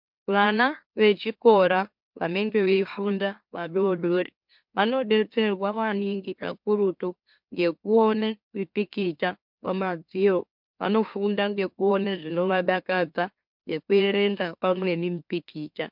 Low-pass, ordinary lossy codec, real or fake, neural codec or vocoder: 5.4 kHz; MP3, 48 kbps; fake; autoencoder, 44.1 kHz, a latent of 192 numbers a frame, MeloTTS